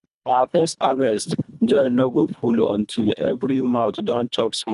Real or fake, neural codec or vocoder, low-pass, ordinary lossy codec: fake; codec, 24 kHz, 1.5 kbps, HILCodec; 10.8 kHz; none